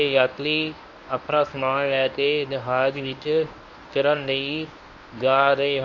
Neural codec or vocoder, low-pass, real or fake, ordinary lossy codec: codec, 24 kHz, 0.9 kbps, WavTokenizer, medium speech release version 1; 7.2 kHz; fake; none